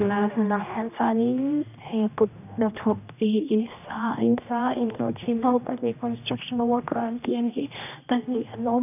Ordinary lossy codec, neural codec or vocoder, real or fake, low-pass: none; codec, 16 kHz, 1 kbps, X-Codec, HuBERT features, trained on general audio; fake; 3.6 kHz